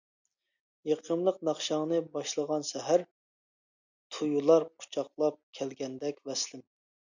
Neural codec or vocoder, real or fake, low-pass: none; real; 7.2 kHz